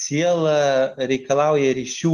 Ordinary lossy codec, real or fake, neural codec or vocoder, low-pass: Opus, 32 kbps; real; none; 14.4 kHz